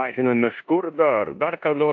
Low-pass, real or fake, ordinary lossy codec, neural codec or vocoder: 7.2 kHz; fake; AAC, 48 kbps; codec, 16 kHz in and 24 kHz out, 0.9 kbps, LongCat-Audio-Codec, four codebook decoder